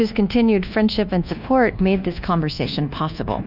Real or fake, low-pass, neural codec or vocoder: fake; 5.4 kHz; codec, 24 kHz, 1.2 kbps, DualCodec